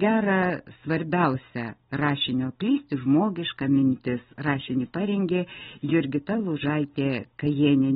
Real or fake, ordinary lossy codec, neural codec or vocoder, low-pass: real; AAC, 16 kbps; none; 10.8 kHz